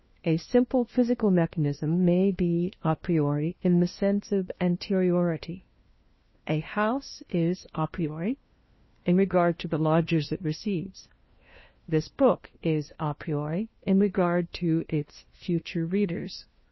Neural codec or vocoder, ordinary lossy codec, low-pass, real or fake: codec, 16 kHz, 1 kbps, FunCodec, trained on LibriTTS, 50 frames a second; MP3, 24 kbps; 7.2 kHz; fake